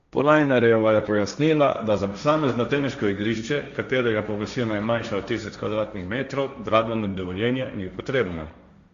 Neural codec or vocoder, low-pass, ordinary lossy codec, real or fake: codec, 16 kHz, 1.1 kbps, Voila-Tokenizer; 7.2 kHz; Opus, 64 kbps; fake